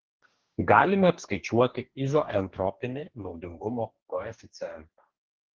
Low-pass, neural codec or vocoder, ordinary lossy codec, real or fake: 7.2 kHz; codec, 44.1 kHz, 2.6 kbps, DAC; Opus, 16 kbps; fake